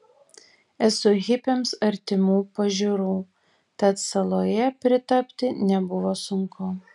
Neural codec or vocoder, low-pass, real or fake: none; 10.8 kHz; real